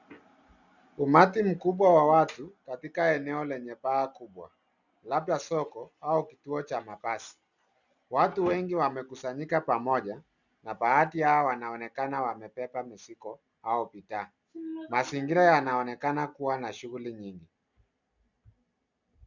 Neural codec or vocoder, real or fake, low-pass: none; real; 7.2 kHz